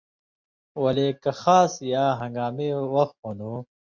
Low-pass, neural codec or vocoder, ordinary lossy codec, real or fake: 7.2 kHz; none; MP3, 64 kbps; real